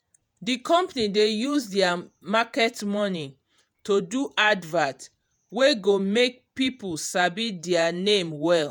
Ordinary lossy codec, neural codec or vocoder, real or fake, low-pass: none; vocoder, 48 kHz, 128 mel bands, Vocos; fake; none